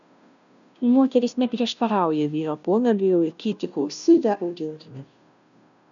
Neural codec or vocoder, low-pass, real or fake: codec, 16 kHz, 0.5 kbps, FunCodec, trained on Chinese and English, 25 frames a second; 7.2 kHz; fake